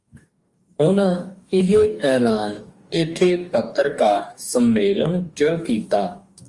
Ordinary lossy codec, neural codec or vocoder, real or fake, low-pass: Opus, 32 kbps; codec, 44.1 kHz, 2.6 kbps, DAC; fake; 10.8 kHz